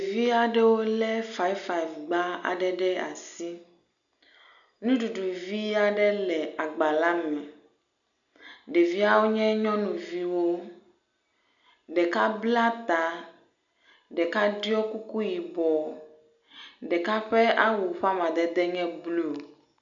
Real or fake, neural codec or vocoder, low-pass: real; none; 7.2 kHz